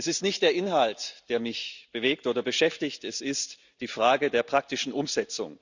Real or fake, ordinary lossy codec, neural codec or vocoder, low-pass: real; Opus, 64 kbps; none; 7.2 kHz